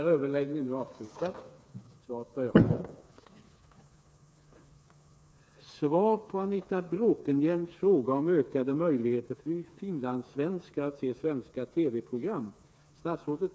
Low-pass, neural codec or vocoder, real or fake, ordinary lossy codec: none; codec, 16 kHz, 4 kbps, FreqCodec, smaller model; fake; none